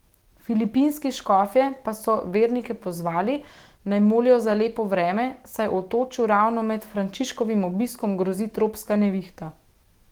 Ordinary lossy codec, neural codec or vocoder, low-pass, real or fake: Opus, 16 kbps; autoencoder, 48 kHz, 128 numbers a frame, DAC-VAE, trained on Japanese speech; 19.8 kHz; fake